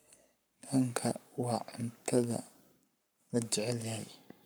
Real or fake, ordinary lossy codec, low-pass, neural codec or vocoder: fake; none; none; codec, 44.1 kHz, 7.8 kbps, Pupu-Codec